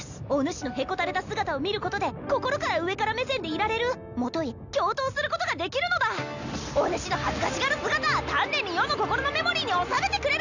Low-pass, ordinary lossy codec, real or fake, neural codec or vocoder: 7.2 kHz; none; real; none